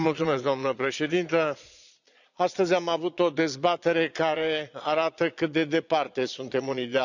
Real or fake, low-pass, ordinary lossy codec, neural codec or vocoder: fake; 7.2 kHz; none; vocoder, 22.05 kHz, 80 mel bands, Vocos